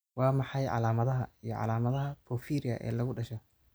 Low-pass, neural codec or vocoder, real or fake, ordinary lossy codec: none; none; real; none